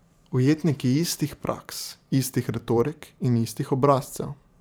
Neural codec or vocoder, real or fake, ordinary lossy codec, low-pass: vocoder, 44.1 kHz, 128 mel bands every 512 samples, BigVGAN v2; fake; none; none